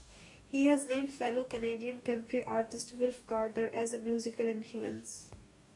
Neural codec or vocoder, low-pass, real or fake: codec, 44.1 kHz, 2.6 kbps, DAC; 10.8 kHz; fake